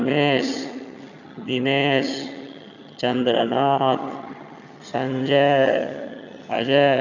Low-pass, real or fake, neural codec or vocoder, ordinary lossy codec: 7.2 kHz; fake; vocoder, 22.05 kHz, 80 mel bands, HiFi-GAN; none